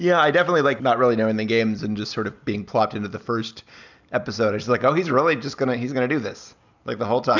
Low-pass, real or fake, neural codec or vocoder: 7.2 kHz; real; none